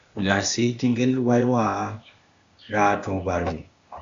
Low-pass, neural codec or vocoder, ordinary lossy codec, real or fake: 7.2 kHz; codec, 16 kHz, 0.8 kbps, ZipCodec; AAC, 64 kbps; fake